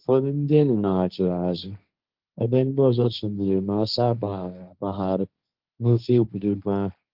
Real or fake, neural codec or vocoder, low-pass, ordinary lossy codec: fake; codec, 16 kHz, 1.1 kbps, Voila-Tokenizer; 5.4 kHz; Opus, 24 kbps